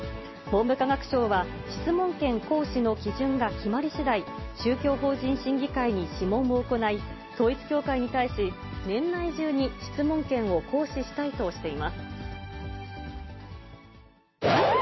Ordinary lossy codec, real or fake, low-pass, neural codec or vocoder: MP3, 24 kbps; real; 7.2 kHz; none